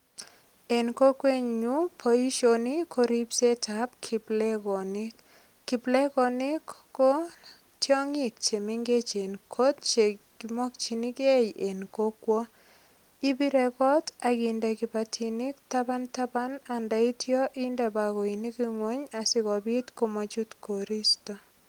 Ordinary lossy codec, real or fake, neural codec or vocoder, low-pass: Opus, 24 kbps; real; none; 19.8 kHz